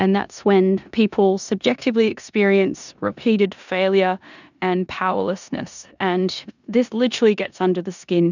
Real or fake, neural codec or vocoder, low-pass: fake; codec, 16 kHz in and 24 kHz out, 0.9 kbps, LongCat-Audio-Codec, four codebook decoder; 7.2 kHz